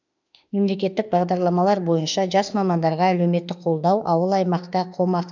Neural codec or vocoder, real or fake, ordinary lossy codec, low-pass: autoencoder, 48 kHz, 32 numbers a frame, DAC-VAE, trained on Japanese speech; fake; none; 7.2 kHz